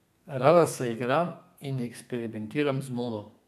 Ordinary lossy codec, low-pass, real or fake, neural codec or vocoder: none; 14.4 kHz; fake; codec, 32 kHz, 1.9 kbps, SNAC